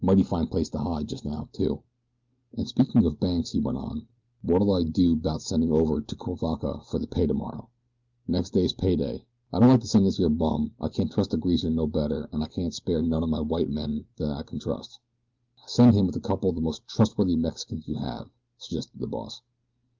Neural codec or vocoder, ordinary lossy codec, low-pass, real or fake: none; Opus, 32 kbps; 7.2 kHz; real